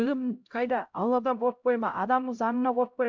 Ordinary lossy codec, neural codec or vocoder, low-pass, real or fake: MP3, 64 kbps; codec, 16 kHz, 0.5 kbps, X-Codec, HuBERT features, trained on LibriSpeech; 7.2 kHz; fake